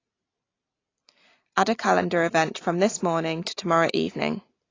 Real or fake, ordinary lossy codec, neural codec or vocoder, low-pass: real; AAC, 32 kbps; none; 7.2 kHz